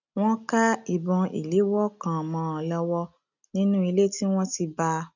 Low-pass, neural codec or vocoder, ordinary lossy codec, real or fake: 7.2 kHz; none; none; real